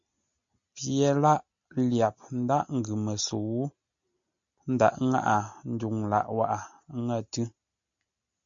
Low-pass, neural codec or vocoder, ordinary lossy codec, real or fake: 7.2 kHz; none; MP3, 64 kbps; real